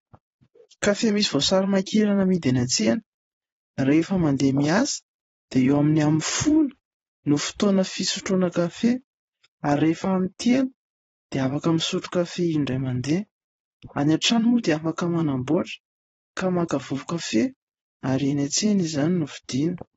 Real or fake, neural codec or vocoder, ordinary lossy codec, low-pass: fake; vocoder, 22.05 kHz, 80 mel bands, Vocos; AAC, 24 kbps; 9.9 kHz